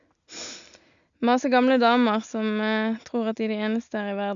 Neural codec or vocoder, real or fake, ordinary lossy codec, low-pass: none; real; none; 7.2 kHz